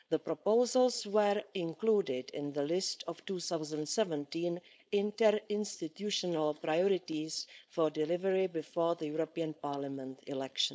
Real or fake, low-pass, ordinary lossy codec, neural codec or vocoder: fake; none; none; codec, 16 kHz, 4.8 kbps, FACodec